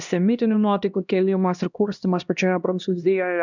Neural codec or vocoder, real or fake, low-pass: codec, 16 kHz, 1 kbps, X-Codec, HuBERT features, trained on LibriSpeech; fake; 7.2 kHz